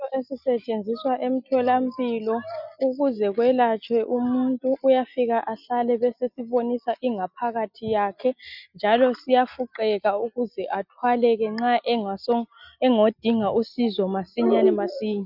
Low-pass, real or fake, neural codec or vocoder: 5.4 kHz; real; none